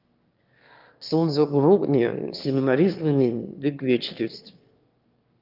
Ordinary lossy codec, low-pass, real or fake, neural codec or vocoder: Opus, 32 kbps; 5.4 kHz; fake; autoencoder, 22.05 kHz, a latent of 192 numbers a frame, VITS, trained on one speaker